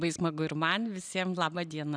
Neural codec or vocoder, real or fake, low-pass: none; real; 9.9 kHz